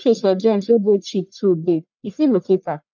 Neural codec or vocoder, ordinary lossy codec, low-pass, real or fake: codec, 44.1 kHz, 1.7 kbps, Pupu-Codec; none; 7.2 kHz; fake